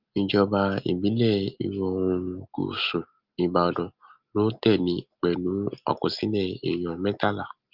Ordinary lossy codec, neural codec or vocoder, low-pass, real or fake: Opus, 32 kbps; none; 5.4 kHz; real